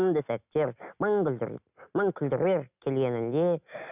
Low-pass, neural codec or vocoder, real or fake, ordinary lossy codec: 3.6 kHz; none; real; none